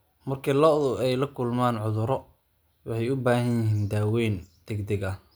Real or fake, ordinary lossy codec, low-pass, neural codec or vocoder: real; none; none; none